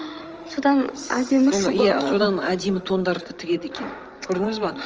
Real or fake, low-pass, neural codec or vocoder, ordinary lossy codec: fake; 7.2 kHz; codec, 16 kHz, 16 kbps, FreqCodec, larger model; Opus, 24 kbps